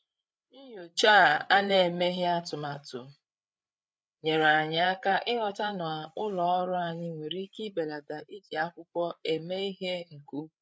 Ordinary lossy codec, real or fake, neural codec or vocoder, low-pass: none; fake; codec, 16 kHz, 8 kbps, FreqCodec, larger model; none